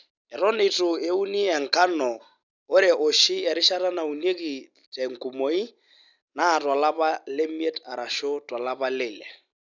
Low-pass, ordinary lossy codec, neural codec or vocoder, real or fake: 7.2 kHz; none; none; real